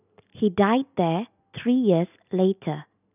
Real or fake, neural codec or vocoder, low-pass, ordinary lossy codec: real; none; 3.6 kHz; none